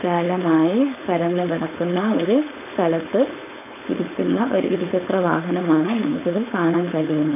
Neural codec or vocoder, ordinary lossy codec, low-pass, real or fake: codec, 16 kHz, 4.8 kbps, FACodec; none; 3.6 kHz; fake